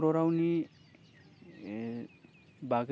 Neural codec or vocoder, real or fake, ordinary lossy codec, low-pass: none; real; none; none